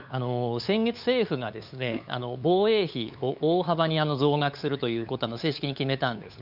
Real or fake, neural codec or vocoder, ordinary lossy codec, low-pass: fake; codec, 16 kHz, 8 kbps, FunCodec, trained on LibriTTS, 25 frames a second; none; 5.4 kHz